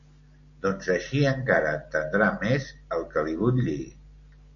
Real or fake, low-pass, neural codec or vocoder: real; 7.2 kHz; none